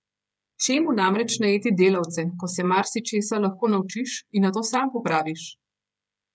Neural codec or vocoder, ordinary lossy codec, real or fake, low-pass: codec, 16 kHz, 16 kbps, FreqCodec, smaller model; none; fake; none